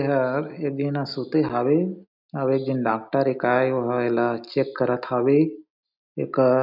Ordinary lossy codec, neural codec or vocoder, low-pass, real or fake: none; none; 5.4 kHz; real